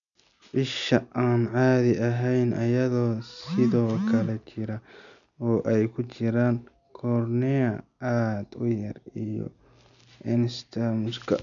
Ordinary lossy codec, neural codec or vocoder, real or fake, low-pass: none; none; real; 7.2 kHz